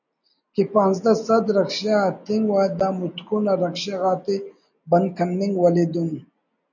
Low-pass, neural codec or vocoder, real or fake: 7.2 kHz; none; real